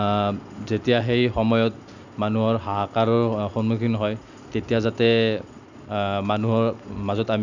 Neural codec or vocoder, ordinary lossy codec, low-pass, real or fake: none; none; 7.2 kHz; real